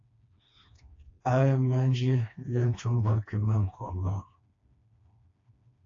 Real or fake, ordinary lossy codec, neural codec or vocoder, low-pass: fake; AAC, 48 kbps; codec, 16 kHz, 2 kbps, FreqCodec, smaller model; 7.2 kHz